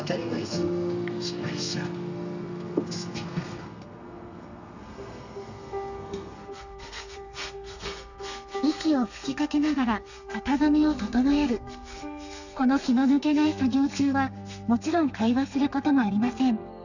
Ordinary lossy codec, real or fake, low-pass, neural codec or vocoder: none; fake; 7.2 kHz; codec, 32 kHz, 1.9 kbps, SNAC